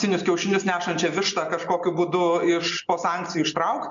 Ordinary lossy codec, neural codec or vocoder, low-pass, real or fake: MP3, 64 kbps; none; 7.2 kHz; real